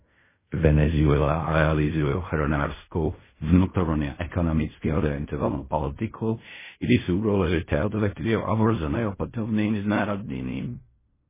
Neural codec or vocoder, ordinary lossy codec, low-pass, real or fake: codec, 16 kHz in and 24 kHz out, 0.4 kbps, LongCat-Audio-Codec, fine tuned four codebook decoder; MP3, 16 kbps; 3.6 kHz; fake